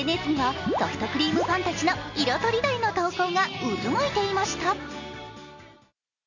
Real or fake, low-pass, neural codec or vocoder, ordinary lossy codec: real; 7.2 kHz; none; none